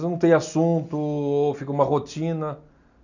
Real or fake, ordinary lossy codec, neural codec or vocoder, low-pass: real; none; none; 7.2 kHz